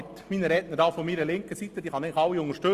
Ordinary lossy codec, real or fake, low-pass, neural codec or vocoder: Opus, 32 kbps; real; 14.4 kHz; none